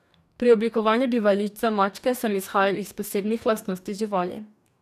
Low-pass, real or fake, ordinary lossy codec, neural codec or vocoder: 14.4 kHz; fake; none; codec, 44.1 kHz, 2.6 kbps, DAC